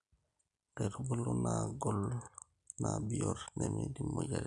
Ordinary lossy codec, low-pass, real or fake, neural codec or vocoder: none; 10.8 kHz; real; none